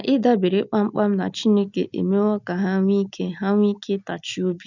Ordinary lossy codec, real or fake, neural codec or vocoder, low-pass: none; real; none; 7.2 kHz